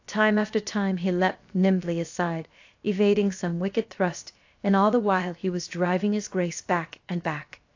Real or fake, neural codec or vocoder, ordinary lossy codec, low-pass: fake; codec, 16 kHz, 0.7 kbps, FocalCodec; AAC, 48 kbps; 7.2 kHz